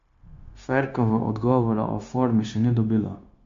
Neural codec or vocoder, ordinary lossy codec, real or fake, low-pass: codec, 16 kHz, 0.9 kbps, LongCat-Audio-Codec; MP3, 48 kbps; fake; 7.2 kHz